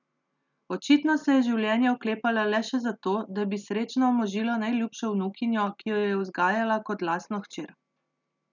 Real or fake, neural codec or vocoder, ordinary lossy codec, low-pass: real; none; none; 7.2 kHz